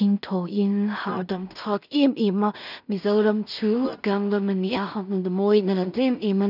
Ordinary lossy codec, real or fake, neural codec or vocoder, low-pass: none; fake; codec, 16 kHz in and 24 kHz out, 0.4 kbps, LongCat-Audio-Codec, two codebook decoder; 5.4 kHz